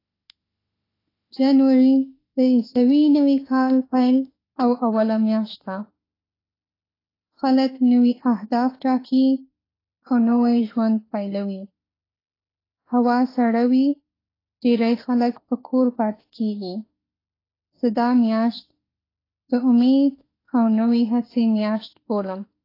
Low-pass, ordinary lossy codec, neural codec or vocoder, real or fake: 5.4 kHz; AAC, 24 kbps; autoencoder, 48 kHz, 32 numbers a frame, DAC-VAE, trained on Japanese speech; fake